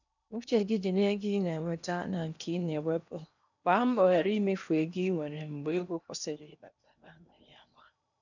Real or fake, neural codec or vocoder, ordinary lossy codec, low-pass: fake; codec, 16 kHz in and 24 kHz out, 0.8 kbps, FocalCodec, streaming, 65536 codes; none; 7.2 kHz